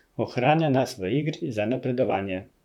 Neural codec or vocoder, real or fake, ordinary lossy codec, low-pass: vocoder, 44.1 kHz, 128 mel bands, Pupu-Vocoder; fake; none; 19.8 kHz